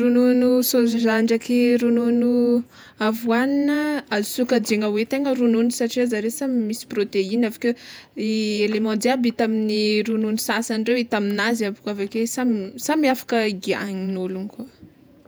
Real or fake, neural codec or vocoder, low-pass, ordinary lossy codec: fake; vocoder, 48 kHz, 128 mel bands, Vocos; none; none